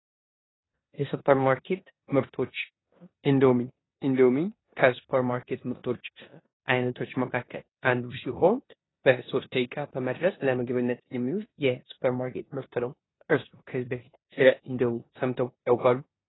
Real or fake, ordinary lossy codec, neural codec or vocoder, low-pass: fake; AAC, 16 kbps; codec, 16 kHz in and 24 kHz out, 0.9 kbps, LongCat-Audio-Codec, four codebook decoder; 7.2 kHz